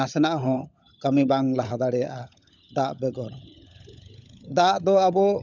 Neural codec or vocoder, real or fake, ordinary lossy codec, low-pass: codec, 16 kHz, 16 kbps, FunCodec, trained on LibriTTS, 50 frames a second; fake; none; 7.2 kHz